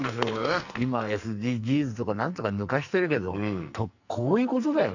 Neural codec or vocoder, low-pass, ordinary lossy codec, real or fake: codec, 44.1 kHz, 2.6 kbps, SNAC; 7.2 kHz; none; fake